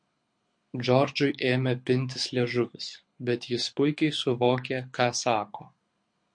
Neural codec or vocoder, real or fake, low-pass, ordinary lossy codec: codec, 24 kHz, 6 kbps, HILCodec; fake; 9.9 kHz; MP3, 48 kbps